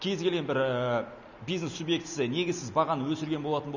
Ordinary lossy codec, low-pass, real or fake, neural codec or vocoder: none; 7.2 kHz; real; none